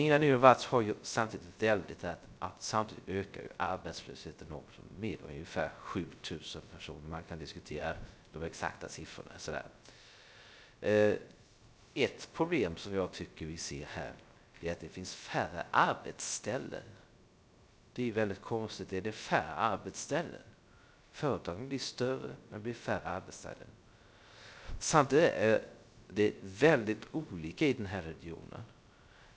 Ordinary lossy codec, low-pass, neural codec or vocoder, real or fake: none; none; codec, 16 kHz, 0.3 kbps, FocalCodec; fake